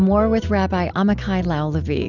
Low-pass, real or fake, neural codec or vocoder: 7.2 kHz; real; none